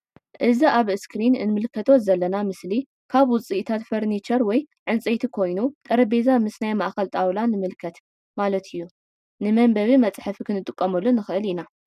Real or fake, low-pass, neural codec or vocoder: real; 14.4 kHz; none